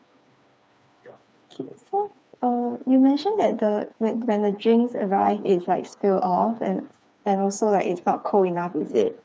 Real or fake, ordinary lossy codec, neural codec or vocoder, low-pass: fake; none; codec, 16 kHz, 4 kbps, FreqCodec, smaller model; none